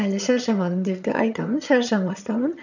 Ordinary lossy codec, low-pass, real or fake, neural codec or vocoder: none; 7.2 kHz; fake; vocoder, 22.05 kHz, 80 mel bands, HiFi-GAN